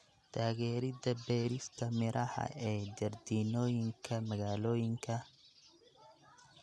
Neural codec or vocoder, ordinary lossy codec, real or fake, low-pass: none; none; real; none